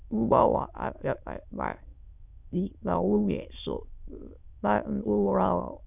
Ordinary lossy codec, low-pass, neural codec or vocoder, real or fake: none; 3.6 kHz; autoencoder, 22.05 kHz, a latent of 192 numbers a frame, VITS, trained on many speakers; fake